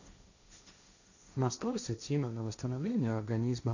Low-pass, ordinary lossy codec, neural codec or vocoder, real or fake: 7.2 kHz; none; codec, 16 kHz, 1.1 kbps, Voila-Tokenizer; fake